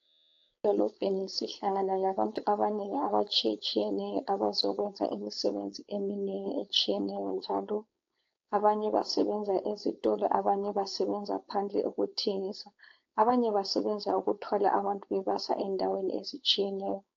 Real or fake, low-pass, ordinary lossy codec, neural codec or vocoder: fake; 7.2 kHz; AAC, 48 kbps; codec, 16 kHz, 4.8 kbps, FACodec